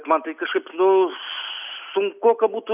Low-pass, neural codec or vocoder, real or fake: 3.6 kHz; none; real